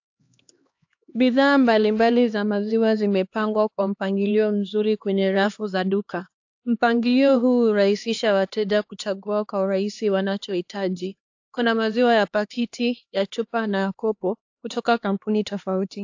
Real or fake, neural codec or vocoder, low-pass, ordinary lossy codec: fake; codec, 16 kHz, 2 kbps, X-Codec, HuBERT features, trained on LibriSpeech; 7.2 kHz; AAC, 48 kbps